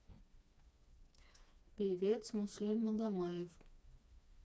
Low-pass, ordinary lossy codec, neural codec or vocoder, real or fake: none; none; codec, 16 kHz, 2 kbps, FreqCodec, smaller model; fake